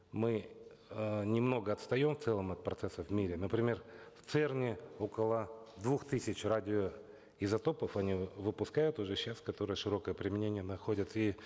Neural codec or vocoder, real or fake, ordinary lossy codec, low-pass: none; real; none; none